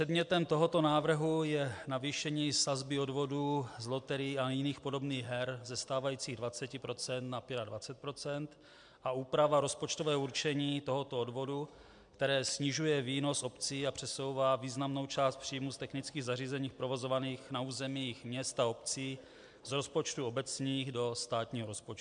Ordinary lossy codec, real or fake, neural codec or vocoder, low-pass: MP3, 64 kbps; real; none; 9.9 kHz